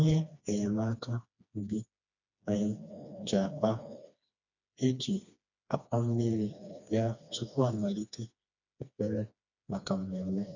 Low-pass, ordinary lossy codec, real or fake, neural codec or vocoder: 7.2 kHz; none; fake; codec, 16 kHz, 2 kbps, FreqCodec, smaller model